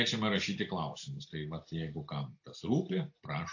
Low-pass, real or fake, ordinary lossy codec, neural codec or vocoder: 7.2 kHz; real; AAC, 48 kbps; none